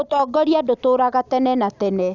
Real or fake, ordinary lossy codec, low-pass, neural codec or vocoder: real; none; 7.2 kHz; none